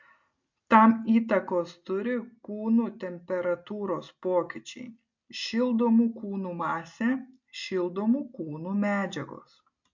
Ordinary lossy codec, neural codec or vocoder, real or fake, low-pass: MP3, 48 kbps; none; real; 7.2 kHz